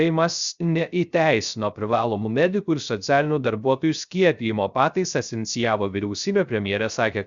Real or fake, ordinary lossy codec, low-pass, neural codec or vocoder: fake; Opus, 64 kbps; 7.2 kHz; codec, 16 kHz, 0.3 kbps, FocalCodec